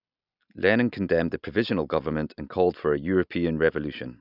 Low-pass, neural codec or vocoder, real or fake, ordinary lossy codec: 5.4 kHz; none; real; none